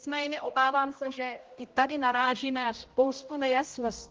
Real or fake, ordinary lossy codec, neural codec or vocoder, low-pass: fake; Opus, 16 kbps; codec, 16 kHz, 0.5 kbps, X-Codec, HuBERT features, trained on general audio; 7.2 kHz